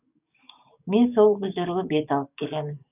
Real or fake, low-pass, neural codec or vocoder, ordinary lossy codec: fake; 3.6 kHz; codec, 44.1 kHz, 7.8 kbps, DAC; none